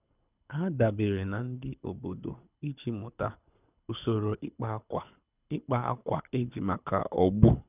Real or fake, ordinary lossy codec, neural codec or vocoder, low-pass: fake; none; codec, 24 kHz, 6 kbps, HILCodec; 3.6 kHz